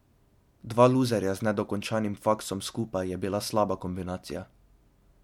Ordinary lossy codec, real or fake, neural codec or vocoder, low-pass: MP3, 96 kbps; real; none; 19.8 kHz